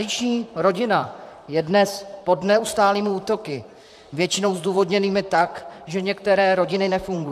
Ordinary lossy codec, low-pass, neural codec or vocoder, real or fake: AAC, 96 kbps; 14.4 kHz; vocoder, 44.1 kHz, 128 mel bands, Pupu-Vocoder; fake